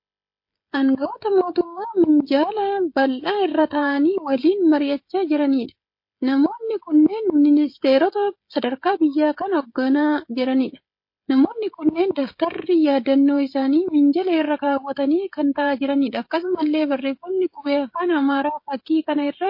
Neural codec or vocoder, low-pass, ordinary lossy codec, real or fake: codec, 16 kHz, 16 kbps, FreqCodec, smaller model; 5.4 kHz; MP3, 32 kbps; fake